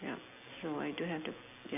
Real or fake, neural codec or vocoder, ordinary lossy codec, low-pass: real; none; none; 3.6 kHz